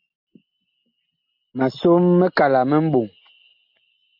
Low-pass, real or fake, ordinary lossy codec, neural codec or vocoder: 5.4 kHz; real; MP3, 48 kbps; none